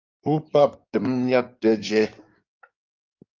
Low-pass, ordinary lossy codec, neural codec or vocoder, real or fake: 7.2 kHz; Opus, 32 kbps; codec, 16 kHz in and 24 kHz out, 2.2 kbps, FireRedTTS-2 codec; fake